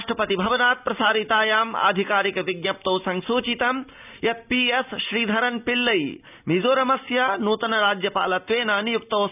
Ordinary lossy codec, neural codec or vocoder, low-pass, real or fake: none; none; 3.6 kHz; real